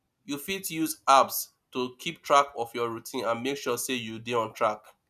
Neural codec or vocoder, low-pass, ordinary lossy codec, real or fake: none; 14.4 kHz; none; real